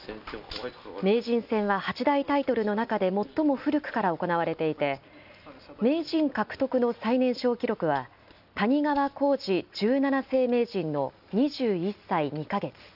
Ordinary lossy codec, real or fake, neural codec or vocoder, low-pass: none; real; none; 5.4 kHz